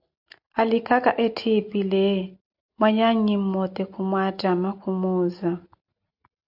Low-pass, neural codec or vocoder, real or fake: 5.4 kHz; none; real